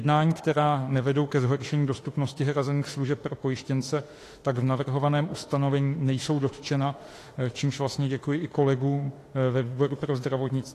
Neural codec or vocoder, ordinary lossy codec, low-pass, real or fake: autoencoder, 48 kHz, 32 numbers a frame, DAC-VAE, trained on Japanese speech; AAC, 48 kbps; 14.4 kHz; fake